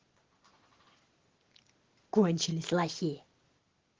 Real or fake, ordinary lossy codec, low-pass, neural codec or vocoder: real; Opus, 16 kbps; 7.2 kHz; none